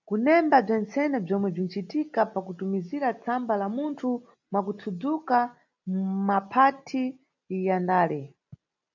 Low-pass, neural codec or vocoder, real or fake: 7.2 kHz; none; real